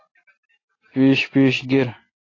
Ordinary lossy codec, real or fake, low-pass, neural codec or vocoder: AAC, 32 kbps; real; 7.2 kHz; none